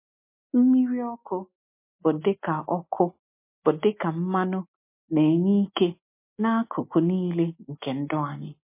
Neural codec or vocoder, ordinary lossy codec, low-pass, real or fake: none; MP3, 24 kbps; 3.6 kHz; real